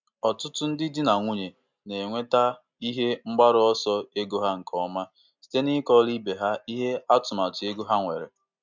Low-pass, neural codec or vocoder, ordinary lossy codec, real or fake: 7.2 kHz; none; MP3, 64 kbps; real